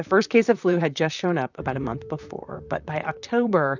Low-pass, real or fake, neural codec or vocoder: 7.2 kHz; fake; vocoder, 44.1 kHz, 128 mel bands, Pupu-Vocoder